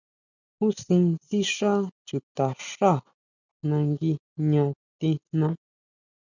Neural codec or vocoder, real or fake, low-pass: none; real; 7.2 kHz